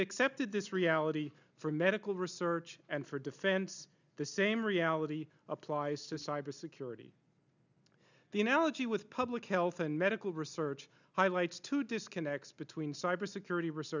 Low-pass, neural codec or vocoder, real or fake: 7.2 kHz; none; real